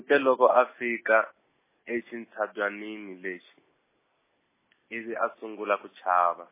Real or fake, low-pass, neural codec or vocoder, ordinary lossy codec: real; 3.6 kHz; none; MP3, 16 kbps